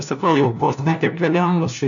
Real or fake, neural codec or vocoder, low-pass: fake; codec, 16 kHz, 1 kbps, FunCodec, trained on LibriTTS, 50 frames a second; 7.2 kHz